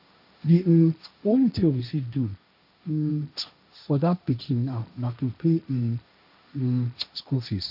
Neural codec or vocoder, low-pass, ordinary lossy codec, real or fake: codec, 16 kHz, 1.1 kbps, Voila-Tokenizer; 5.4 kHz; none; fake